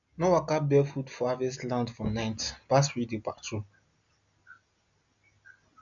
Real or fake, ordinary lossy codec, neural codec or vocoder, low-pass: real; none; none; 7.2 kHz